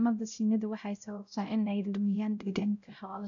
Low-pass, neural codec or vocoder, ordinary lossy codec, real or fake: 7.2 kHz; codec, 16 kHz, 0.5 kbps, X-Codec, WavLM features, trained on Multilingual LibriSpeech; none; fake